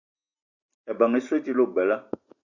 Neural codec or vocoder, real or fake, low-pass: none; real; 7.2 kHz